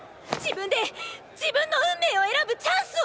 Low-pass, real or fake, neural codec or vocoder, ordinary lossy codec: none; real; none; none